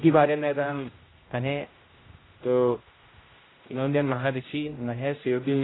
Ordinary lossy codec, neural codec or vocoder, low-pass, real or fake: AAC, 16 kbps; codec, 16 kHz, 0.5 kbps, X-Codec, HuBERT features, trained on general audio; 7.2 kHz; fake